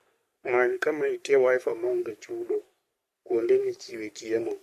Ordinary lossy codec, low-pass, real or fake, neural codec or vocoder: MP3, 64 kbps; 14.4 kHz; fake; codec, 44.1 kHz, 3.4 kbps, Pupu-Codec